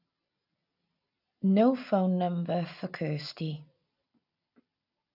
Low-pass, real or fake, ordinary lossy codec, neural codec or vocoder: 5.4 kHz; real; none; none